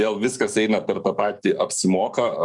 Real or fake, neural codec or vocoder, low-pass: fake; codec, 44.1 kHz, 7.8 kbps, DAC; 10.8 kHz